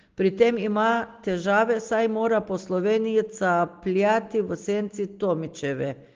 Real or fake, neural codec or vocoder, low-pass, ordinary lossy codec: real; none; 7.2 kHz; Opus, 16 kbps